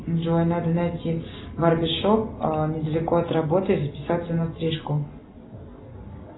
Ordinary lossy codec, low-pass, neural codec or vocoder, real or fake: AAC, 16 kbps; 7.2 kHz; none; real